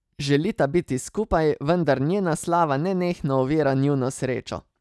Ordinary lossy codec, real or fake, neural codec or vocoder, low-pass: none; real; none; none